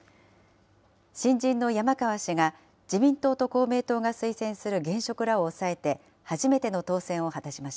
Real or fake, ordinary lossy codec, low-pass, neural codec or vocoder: real; none; none; none